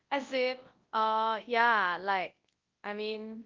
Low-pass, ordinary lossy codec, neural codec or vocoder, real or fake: 7.2 kHz; Opus, 32 kbps; codec, 24 kHz, 0.9 kbps, WavTokenizer, large speech release; fake